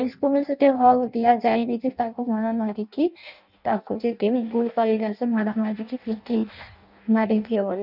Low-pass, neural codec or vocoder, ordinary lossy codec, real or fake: 5.4 kHz; codec, 16 kHz in and 24 kHz out, 0.6 kbps, FireRedTTS-2 codec; none; fake